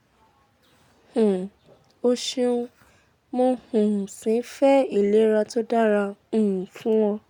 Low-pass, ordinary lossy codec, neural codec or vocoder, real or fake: 19.8 kHz; none; none; real